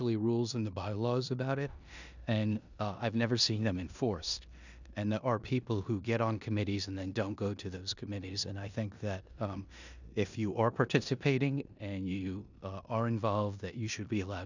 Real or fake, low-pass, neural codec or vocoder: fake; 7.2 kHz; codec, 16 kHz in and 24 kHz out, 0.9 kbps, LongCat-Audio-Codec, four codebook decoder